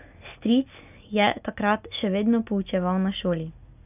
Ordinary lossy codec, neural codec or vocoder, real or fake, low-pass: none; none; real; 3.6 kHz